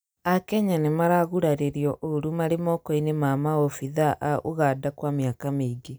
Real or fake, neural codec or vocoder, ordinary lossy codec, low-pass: real; none; none; none